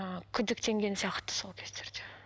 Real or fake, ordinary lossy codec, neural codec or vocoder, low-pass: real; none; none; none